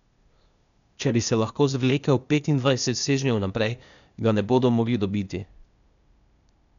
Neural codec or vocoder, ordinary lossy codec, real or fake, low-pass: codec, 16 kHz, 0.8 kbps, ZipCodec; MP3, 96 kbps; fake; 7.2 kHz